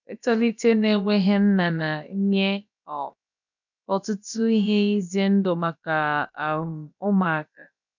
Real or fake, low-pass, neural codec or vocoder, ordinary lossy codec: fake; 7.2 kHz; codec, 16 kHz, about 1 kbps, DyCAST, with the encoder's durations; none